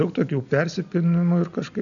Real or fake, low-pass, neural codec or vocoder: real; 7.2 kHz; none